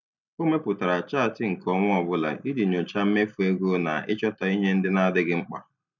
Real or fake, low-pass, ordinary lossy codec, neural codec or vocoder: real; 7.2 kHz; none; none